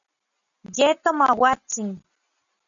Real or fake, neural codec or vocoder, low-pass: real; none; 7.2 kHz